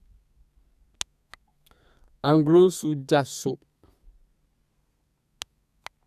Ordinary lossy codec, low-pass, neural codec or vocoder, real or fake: none; 14.4 kHz; codec, 32 kHz, 1.9 kbps, SNAC; fake